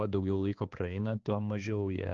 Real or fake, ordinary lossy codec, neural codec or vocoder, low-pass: fake; Opus, 16 kbps; codec, 16 kHz, 2 kbps, X-Codec, HuBERT features, trained on balanced general audio; 7.2 kHz